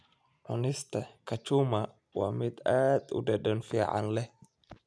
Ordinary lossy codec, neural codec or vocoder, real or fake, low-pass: none; none; real; none